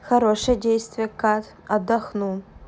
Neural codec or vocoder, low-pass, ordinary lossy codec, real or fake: none; none; none; real